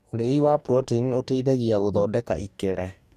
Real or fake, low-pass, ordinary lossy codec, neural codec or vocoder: fake; 14.4 kHz; none; codec, 44.1 kHz, 2.6 kbps, DAC